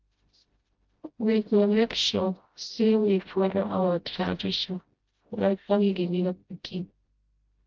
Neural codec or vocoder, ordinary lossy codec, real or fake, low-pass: codec, 16 kHz, 0.5 kbps, FreqCodec, smaller model; Opus, 32 kbps; fake; 7.2 kHz